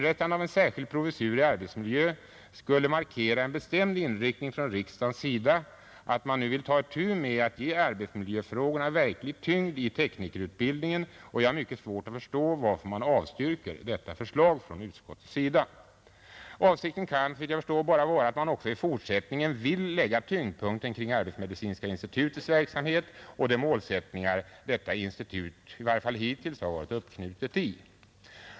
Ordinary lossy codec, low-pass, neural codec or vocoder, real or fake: none; none; none; real